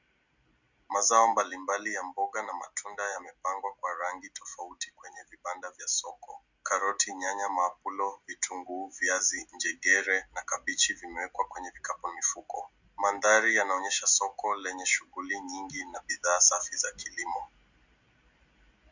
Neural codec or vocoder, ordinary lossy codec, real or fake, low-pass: none; Opus, 64 kbps; real; 7.2 kHz